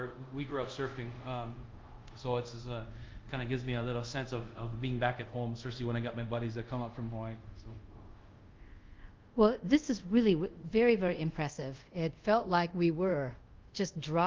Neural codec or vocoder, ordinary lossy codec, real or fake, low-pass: codec, 24 kHz, 0.5 kbps, DualCodec; Opus, 32 kbps; fake; 7.2 kHz